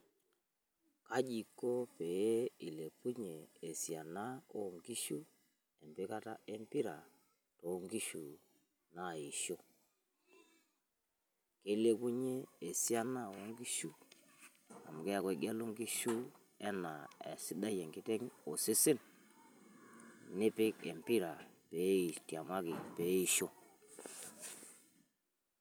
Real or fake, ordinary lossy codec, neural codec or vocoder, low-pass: real; none; none; none